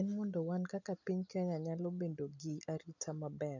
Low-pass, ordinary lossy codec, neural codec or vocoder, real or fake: 7.2 kHz; none; none; real